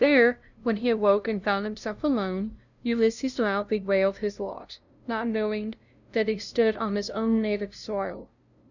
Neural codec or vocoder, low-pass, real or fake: codec, 16 kHz, 0.5 kbps, FunCodec, trained on LibriTTS, 25 frames a second; 7.2 kHz; fake